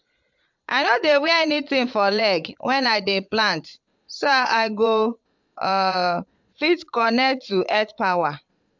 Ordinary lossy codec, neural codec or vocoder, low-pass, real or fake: MP3, 64 kbps; vocoder, 22.05 kHz, 80 mel bands, Vocos; 7.2 kHz; fake